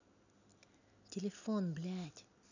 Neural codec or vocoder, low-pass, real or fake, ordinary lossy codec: none; 7.2 kHz; real; none